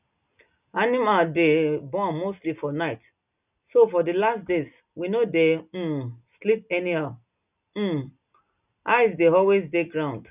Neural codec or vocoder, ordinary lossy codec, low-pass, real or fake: none; none; 3.6 kHz; real